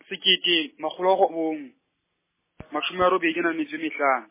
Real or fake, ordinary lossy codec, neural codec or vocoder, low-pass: real; MP3, 16 kbps; none; 3.6 kHz